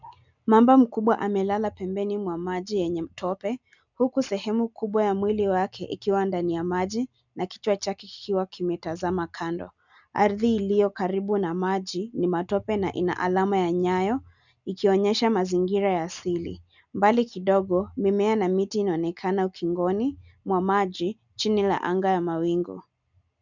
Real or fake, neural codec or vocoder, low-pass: real; none; 7.2 kHz